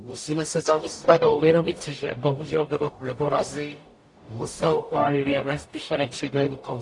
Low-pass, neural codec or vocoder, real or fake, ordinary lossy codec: 10.8 kHz; codec, 44.1 kHz, 0.9 kbps, DAC; fake; AAC, 48 kbps